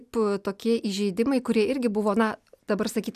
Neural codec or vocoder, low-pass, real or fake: none; 14.4 kHz; real